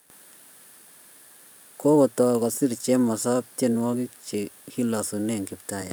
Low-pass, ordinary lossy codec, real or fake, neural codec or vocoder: none; none; fake; vocoder, 44.1 kHz, 128 mel bands every 512 samples, BigVGAN v2